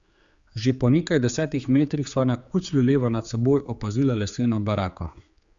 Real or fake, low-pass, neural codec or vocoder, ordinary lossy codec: fake; 7.2 kHz; codec, 16 kHz, 4 kbps, X-Codec, HuBERT features, trained on general audio; Opus, 64 kbps